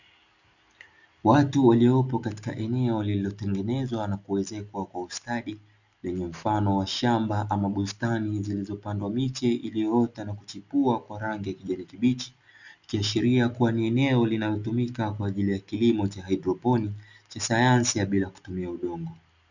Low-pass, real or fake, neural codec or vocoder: 7.2 kHz; real; none